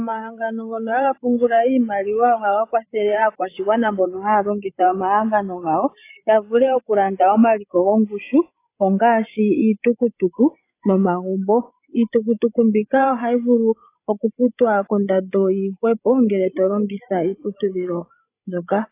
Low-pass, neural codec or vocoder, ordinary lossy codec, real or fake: 3.6 kHz; codec, 16 kHz, 8 kbps, FreqCodec, larger model; AAC, 24 kbps; fake